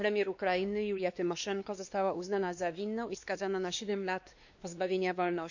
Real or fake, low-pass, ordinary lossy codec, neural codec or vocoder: fake; 7.2 kHz; none; codec, 16 kHz, 2 kbps, X-Codec, WavLM features, trained on Multilingual LibriSpeech